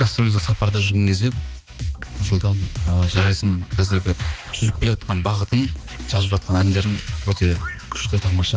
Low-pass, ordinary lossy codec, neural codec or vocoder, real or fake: none; none; codec, 16 kHz, 2 kbps, X-Codec, HuBERT features, trained on balanced general audio; fake